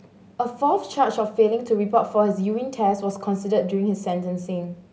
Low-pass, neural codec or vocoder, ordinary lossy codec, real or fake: none; none; none; real